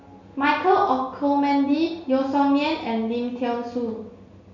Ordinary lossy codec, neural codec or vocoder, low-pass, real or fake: none; none; 7.2 kHz; real